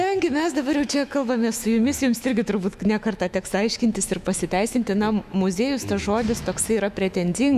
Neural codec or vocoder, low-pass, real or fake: autoencoder, 48 kHz, 128 numbers a frame, DAC-VAE, trained on Japanese speech; 14.4 kHz; fake